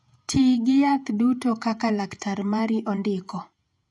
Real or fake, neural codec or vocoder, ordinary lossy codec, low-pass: fake; vocoder, 48 kHz, 128 mel bands, Vocos; none; 10.8 kHz